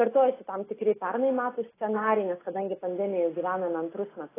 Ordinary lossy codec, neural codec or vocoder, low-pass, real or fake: AAC, 16 kbps; none; 3.6 kHz; real